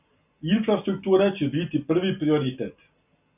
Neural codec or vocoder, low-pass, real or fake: none; 3.6 kHz; real